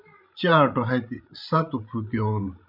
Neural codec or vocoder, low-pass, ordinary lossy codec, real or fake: codec, 16 kHz, 16 kbps, FreqCodec, larger model; 5.4 kHz; MP3, 48 kbps; fake